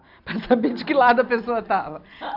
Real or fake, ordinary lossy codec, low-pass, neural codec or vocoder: real; none; 5.4 kHz; none